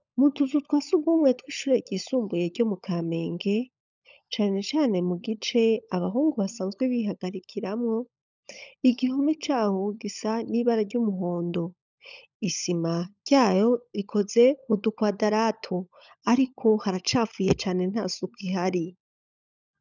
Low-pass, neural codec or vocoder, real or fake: 7.2 kHz; codec, 16 kHz, 16 kbps, FunCodec, trained on LibriTTS, 50 frames a second; fake